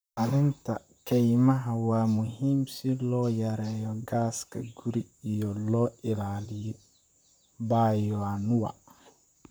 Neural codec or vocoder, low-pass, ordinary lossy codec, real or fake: vocoder, 44.1 kHz, 128 mel bands, Pupu-Vocoder; none; none; fake